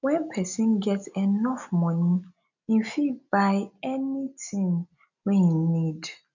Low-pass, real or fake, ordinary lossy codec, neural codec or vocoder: 7.2 kHz; real; none; none